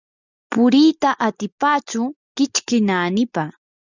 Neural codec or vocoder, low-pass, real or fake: none; 7.2 kHz; real